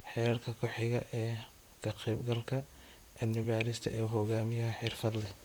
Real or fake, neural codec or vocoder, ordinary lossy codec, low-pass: fake; vocoder, 44.1 kHz, 128 mel bands, Pupu-Vocoder; none; none